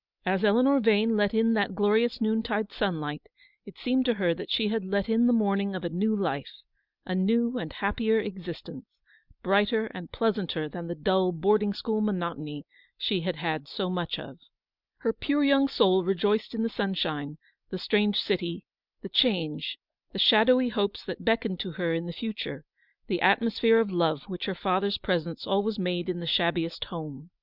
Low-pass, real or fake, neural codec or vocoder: 5.4 kHz; real; none